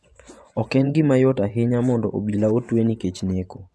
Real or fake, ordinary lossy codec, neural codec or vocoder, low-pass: fake; none; vocoder, 24 kHz, 100 mel bands, Vocos; none